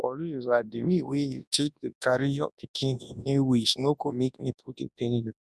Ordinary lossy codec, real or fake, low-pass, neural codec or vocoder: none; fake; none; codec, 24 kHz, 0.9 kbps, WavTokenizer, large speech release